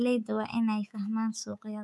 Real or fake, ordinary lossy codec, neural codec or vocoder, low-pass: fake; none; codec, 24 kHz, 3.1 kbps, DualCodec; none